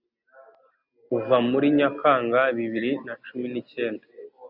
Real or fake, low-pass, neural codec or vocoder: real; 5.4 kHz; none